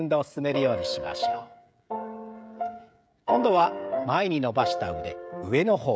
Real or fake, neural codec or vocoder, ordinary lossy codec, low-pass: fake; codec, 16 kHz, 16 kbps, FreqCodec, smaller model; none; none